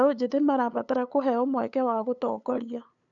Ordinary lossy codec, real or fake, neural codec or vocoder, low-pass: none; fake; codec, 16 kHz, 8 kbps, FunCodec, trained on LibriTTS, 25 frames a second; 7.2 kHz